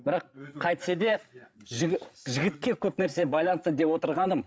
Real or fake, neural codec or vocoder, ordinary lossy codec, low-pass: fake; codec, 16 kHz, 8 kbps, FreqCodec, larger model; none; none